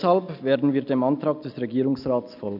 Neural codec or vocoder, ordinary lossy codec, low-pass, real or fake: none; none; 5.4 kHz; real